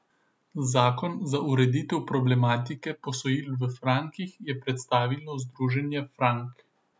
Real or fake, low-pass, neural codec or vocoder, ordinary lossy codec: real; none; none; none